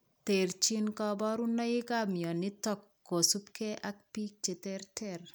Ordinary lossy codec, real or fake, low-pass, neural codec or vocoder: none; real; none; none